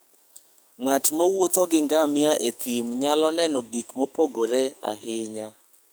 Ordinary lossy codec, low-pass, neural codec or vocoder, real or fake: none; none; codec, 44.1 kHz, 2.6 kbps, SNAC; fake